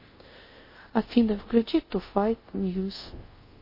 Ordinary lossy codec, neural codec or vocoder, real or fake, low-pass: MP3, 24 kbps; codec, 24 kHz, 0.5 kbps, DualCodec; fake; 5.4 kHz